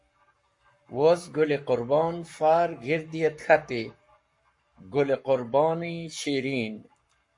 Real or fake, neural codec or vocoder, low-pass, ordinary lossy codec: fake; codec, 44.1 kHz, 7.8 kbps, Pupu-Codec; 10.8 kHz; MP3, 48 kbps